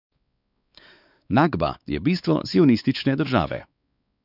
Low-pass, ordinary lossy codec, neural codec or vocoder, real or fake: 5.4 kHz; none; codec, 16 kHz, 4 kbps, X-Codec, WavLM features, trained on Multilingual LibriSpeech; fake